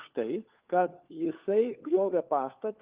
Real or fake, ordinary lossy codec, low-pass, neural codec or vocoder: fake; Opus, 32 kbps; 3.6 kHz; codec, 16 kHz, 4 kbps, FunCodec, trained on LibriTTS, 50 frames a second